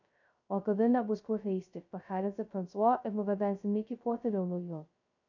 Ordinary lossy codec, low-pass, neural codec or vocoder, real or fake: AAC, 48 kbps; 7.2 kHz; codec, 16 kHz, 0.2 kbps, FocalCodec; fake